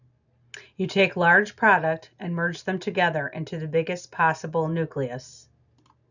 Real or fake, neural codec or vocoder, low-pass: real; none; 7.2 kHz